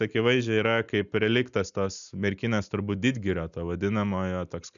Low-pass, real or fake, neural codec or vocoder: 7.2 kHz; real; none